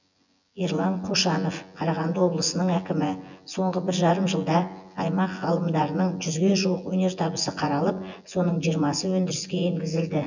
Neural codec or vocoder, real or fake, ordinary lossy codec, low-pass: vocoder, 24 kHz, 100 mel bands, Vocos; fake; none; 7.2 kHz